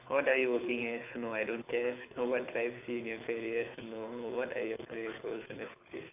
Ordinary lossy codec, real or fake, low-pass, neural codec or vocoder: AAC, 32 kbps; fake; 3.6 kHz; codec, 24 kHz, 6 kbps, HILCodec